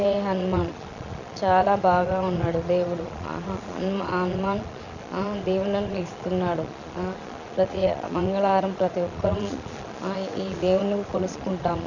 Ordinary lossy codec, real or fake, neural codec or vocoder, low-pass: none; fake; vocoder, 22.05 kHz, 80 mel bands, Vocos; 7.2 kHz